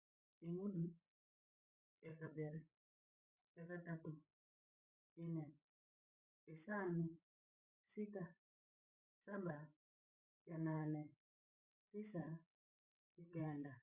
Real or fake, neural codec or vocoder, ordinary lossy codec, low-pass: fake; codec, 16 kHz, 16 kbps, FreqCodec, larger model; Opus, 64 kbps; 3.6 kHz